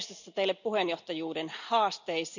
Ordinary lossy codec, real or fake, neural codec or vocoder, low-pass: none; real; none; 7.2 kHz